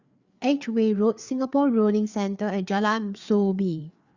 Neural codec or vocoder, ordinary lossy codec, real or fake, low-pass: codec, 16 kHz, 4 kbps, FreqCodec, larger model; Opus, 64 kbps; fake; 7.2 kHz